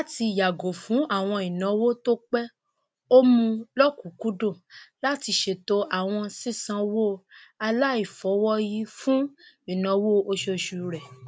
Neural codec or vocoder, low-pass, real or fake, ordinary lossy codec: none; none; real; none